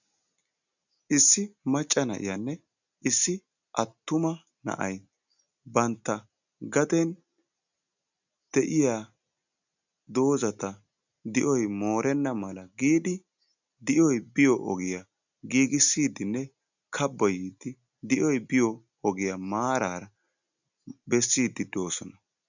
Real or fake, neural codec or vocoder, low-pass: real; none; 7.2 kHz